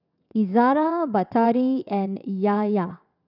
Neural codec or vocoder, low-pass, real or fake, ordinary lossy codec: vocoder, 22.05 kHz, 80 mel bands, WaveNeXt; 5.4 kHz; fake; none